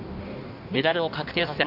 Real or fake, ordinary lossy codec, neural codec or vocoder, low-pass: fake; none; codec, 16 kHz in and 24 kHz out, 1.1 kbps, FireRedTTS-2 codec; 5.4 kHz